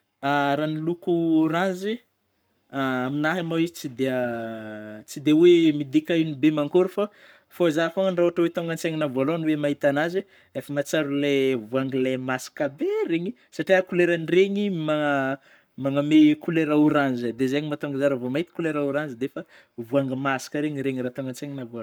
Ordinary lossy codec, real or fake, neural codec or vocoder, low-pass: none; fake; codec, 44.1 kHz, 7.8 kbps, Pupu-Codec; none